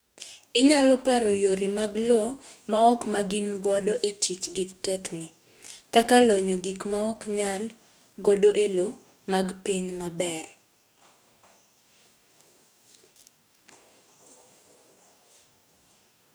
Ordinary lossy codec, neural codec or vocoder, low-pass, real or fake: none; codec, 44.1 kHz, 2.6 kbps, DAC; none; fake